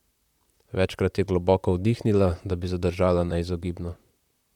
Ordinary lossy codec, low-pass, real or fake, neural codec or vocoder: none; 19.8 kHz; fake; vocoder, 44.1 kHz, 128 mel bands, Pupu-Vocoder